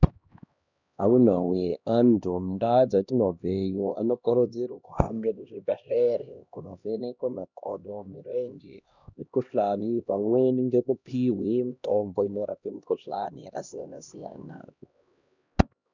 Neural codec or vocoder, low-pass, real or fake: codec, 16 kHz, 1 kbps, X-Codec, HuBERT features, trained on LibriSpeech; 7.2 kHz; fake